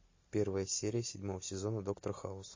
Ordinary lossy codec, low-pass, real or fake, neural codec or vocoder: MP3, 32 kbps; 7.2 kHz; real; none